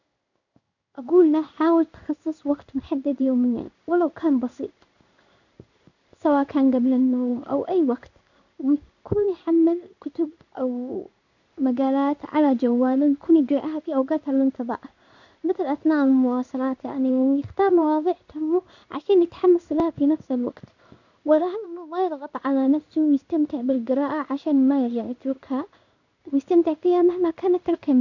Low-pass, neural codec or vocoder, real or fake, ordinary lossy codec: 7.2 kHz; codec, 16 kHz in and 24 kHz out, 1 kbps, XY-Tokenizer; fake; none